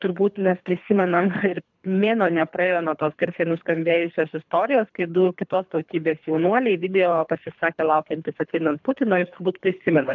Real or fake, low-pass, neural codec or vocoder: fake; 7.2 kHz; codec, 24 kHz, 3 kbps, HILCodec